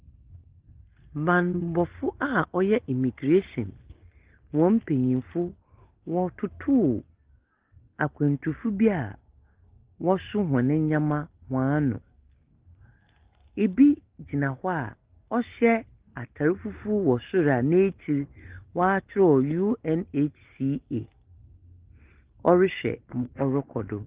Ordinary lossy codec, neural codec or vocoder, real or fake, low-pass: Opus, 16 kbps; none; real; 3.6 kHz